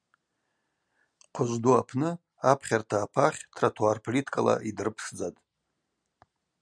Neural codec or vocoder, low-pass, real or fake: none; 9.9 kHz; real